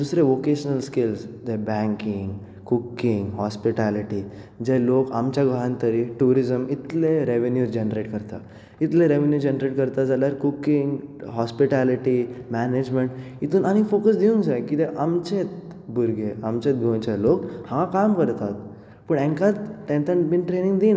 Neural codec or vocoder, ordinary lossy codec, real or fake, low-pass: none; none; real; none